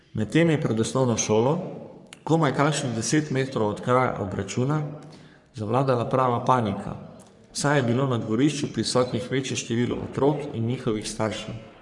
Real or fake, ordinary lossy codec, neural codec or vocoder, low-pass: fake; none; codec, 44.1 kHz, 3.4 kbps, Pupu-Codec; 10.8 kHz